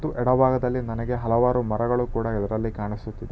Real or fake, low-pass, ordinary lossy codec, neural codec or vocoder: real; none; none; none